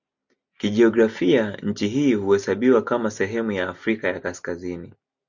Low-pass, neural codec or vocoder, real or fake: 7.2 kHz; none; real